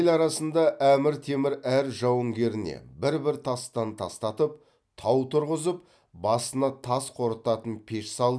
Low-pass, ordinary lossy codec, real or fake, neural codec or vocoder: none; none; real; none